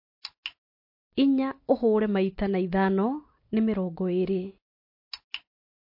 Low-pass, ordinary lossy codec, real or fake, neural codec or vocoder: 5.4 kHz; MP3, 32 kbps; real; none